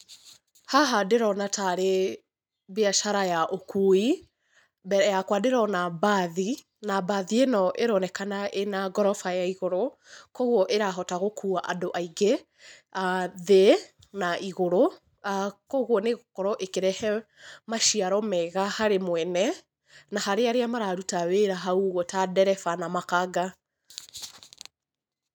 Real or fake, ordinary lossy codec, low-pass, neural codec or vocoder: real; none; none; none